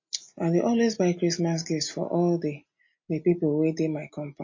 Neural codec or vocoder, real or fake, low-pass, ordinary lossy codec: none; real; 7.2 kHz; MP3, 32 kbps